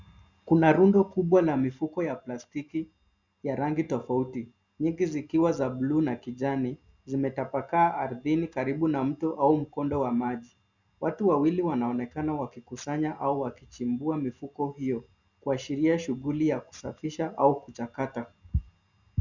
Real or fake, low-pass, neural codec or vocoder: real; 7.2 kHz; none